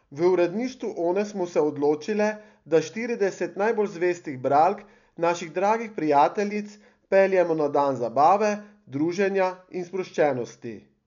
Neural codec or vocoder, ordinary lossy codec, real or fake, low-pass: none; none; real; 7.2 kHz